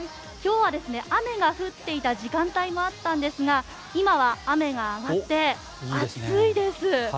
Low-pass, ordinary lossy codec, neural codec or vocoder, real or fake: none; none; none; real